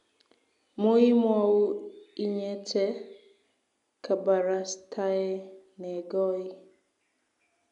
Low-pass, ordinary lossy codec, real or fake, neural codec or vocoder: 10.8 kHz; none; real; none